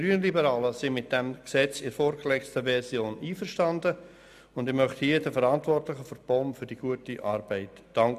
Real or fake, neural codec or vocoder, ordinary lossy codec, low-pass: real; none; none; 14.4 kHz